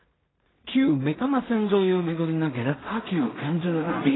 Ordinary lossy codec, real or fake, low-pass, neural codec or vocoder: AAC, 16 kbps; fake; 7.2 kHz; codec, 16 kHz in and 24 kHz out, 0.4 kbps, LongCat-Audio-Codec, two codebook decoder